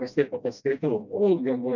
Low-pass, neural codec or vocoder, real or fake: 7.2 kHz; codec, 16 kHz, 1 kbps, FreqCodec, smaller model; fake